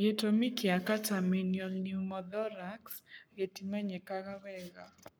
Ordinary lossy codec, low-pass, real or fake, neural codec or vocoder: none; none; fake; codec, 44.1 kHz, 7.8 kbps, Pupu-Codec